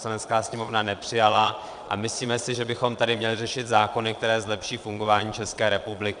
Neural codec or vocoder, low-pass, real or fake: vocoder, 22.05 kHz, 80 mel bands, Vocos; 9.9 kHz; fake